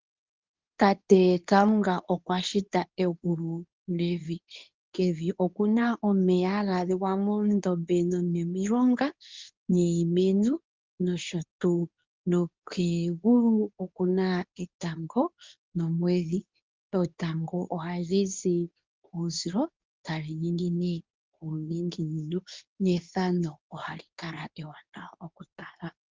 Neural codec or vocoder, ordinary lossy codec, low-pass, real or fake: codec, 24 kHz, 0.9 kbps, WavTokenizer, medium speech release version 2; Opus, 32 kbps; 7.2 kHz; fake